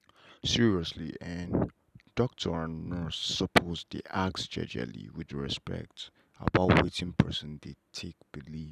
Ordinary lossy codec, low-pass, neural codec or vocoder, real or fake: none; 14.4 kHz; none; real